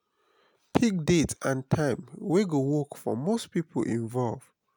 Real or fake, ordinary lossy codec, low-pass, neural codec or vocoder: real; none; none; none